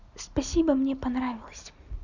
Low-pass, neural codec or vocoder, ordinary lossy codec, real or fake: 7.2 kHz; none; none; real